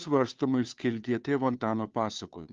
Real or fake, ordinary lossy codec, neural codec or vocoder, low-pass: fake; Opus, 32 kbps; codec, 16 kHz, 2 kbps, FunCodec, trained on Chinese and English, 25 frames a second; 7.2 kHz